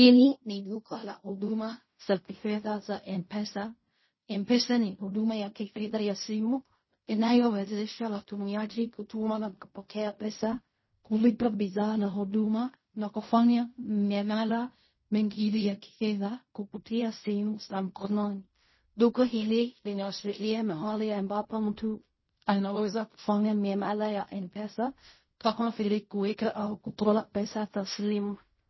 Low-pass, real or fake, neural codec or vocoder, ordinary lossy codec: 7.2 kHz; fake; codec, 16 kHz in and 24 kHz out, 0.4 kbps, LongCat-Audio-Codec, fine tuned four codebook decoder; MP3, 24 kbps